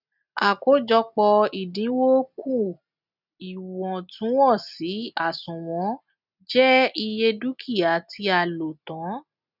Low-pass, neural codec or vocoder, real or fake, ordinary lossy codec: 5.4 kHz; none; real; none